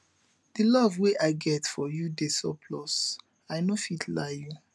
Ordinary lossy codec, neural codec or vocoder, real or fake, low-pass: none; none; real; none